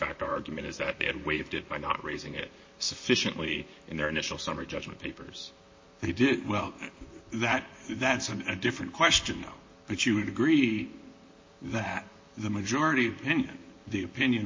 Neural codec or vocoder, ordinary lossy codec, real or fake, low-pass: vocoder, 44.1 kHz, 128 mel bands, Pupu-Vocoder; MP3, 32 kbps; fake; 7.2 kHz